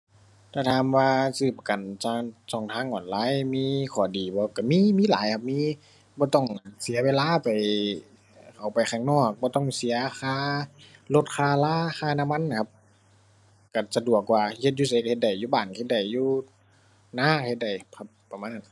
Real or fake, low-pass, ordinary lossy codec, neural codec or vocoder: real; none; none; none